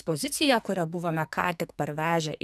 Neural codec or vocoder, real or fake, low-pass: codec, 44.1 kHz, 2.6 kbps, SNAC; fake; 14.4 kHz